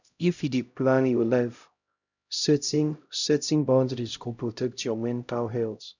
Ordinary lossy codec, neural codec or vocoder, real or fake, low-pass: none; codec, 16 kHz, 0.5 kbps, X-Codec, HuBERT features, trained on LibriSpeech; fake; 7.2 kHz